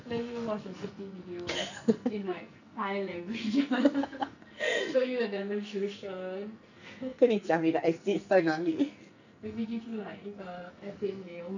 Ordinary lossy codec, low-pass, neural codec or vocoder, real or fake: none; 7.2 kHz; codec, 44.1 kHz, 2.6 kbps, SNAC; fake